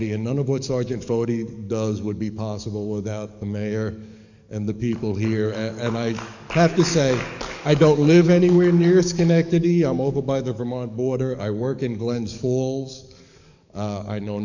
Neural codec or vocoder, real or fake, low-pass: codec, 44.1 kHz, 7.8 kbps, DAC; fake; 7.2 kHz